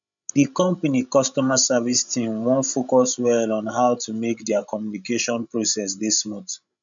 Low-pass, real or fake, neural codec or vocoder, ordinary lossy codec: 7.2 kHz; fake; codec, 16 kHz, 16 kbps, FreqCodec, larger model; none